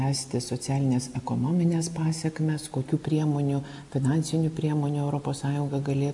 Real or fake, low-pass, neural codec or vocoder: real; 10.8 kHz; none